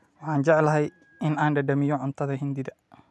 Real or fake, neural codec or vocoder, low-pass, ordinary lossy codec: real; none; none; none